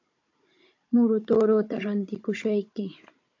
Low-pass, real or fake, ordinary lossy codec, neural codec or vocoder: 7.2 kHz; fake; AAC, 48 kbps; codec, 16 kHz, 16 kbps, FunCodec, trained on Chinese and English, 50 frames a second